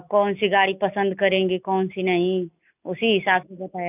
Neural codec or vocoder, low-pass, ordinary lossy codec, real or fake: none; 3.6 kHz; none; real